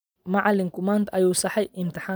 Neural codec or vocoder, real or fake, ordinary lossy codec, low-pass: none; real; none; none